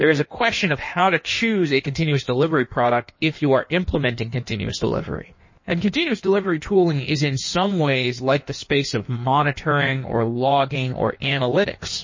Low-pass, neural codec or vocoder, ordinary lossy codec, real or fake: 7.2 kHz; codec, 16 kHz in and 24 kHz out, 1.1 kbps, FireRedTTS-2 codec; MP3, 32 kbps; fake